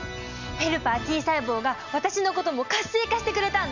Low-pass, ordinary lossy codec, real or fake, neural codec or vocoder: 7.2 kHz; none; real; none